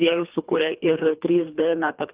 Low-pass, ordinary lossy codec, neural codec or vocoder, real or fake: 3.6 kHz; Opus, 32 kbps; codec, 24 kHz, 3 kbps, HILCodec; fake